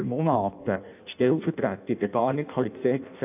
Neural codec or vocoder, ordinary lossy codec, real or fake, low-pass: codec, 16 kHz in and 24 kHz out, 0.6 kbps, FireRedTTS-2 codec; none; fake; 3.6 kHz